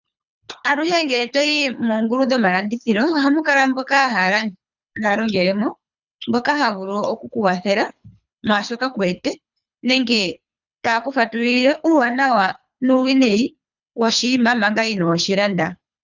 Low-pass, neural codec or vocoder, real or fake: 7.2 kHz; codec, 24 kHz, 3 kbps, HILCodec; fake